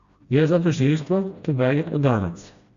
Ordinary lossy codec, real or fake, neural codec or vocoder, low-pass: Opus, 64 kbps; fake; codec, 16 kHz, 1 kbps, FreqCodec, smaller model; 7.2 kHz